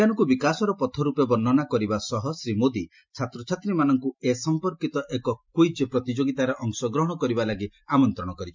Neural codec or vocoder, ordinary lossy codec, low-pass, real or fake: none; MP3, 64 kbps; 7.2 kHz; real